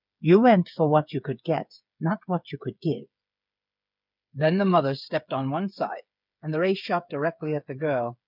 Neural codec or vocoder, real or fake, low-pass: codec, 16 kHz, 8 kbps, FreqCodec, smaller model; fake; 5.4 kHz